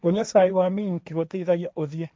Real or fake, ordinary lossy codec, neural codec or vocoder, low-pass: fake; none; codec, 16 kHz, 1.1 kbps, Voila-Tokenizer; none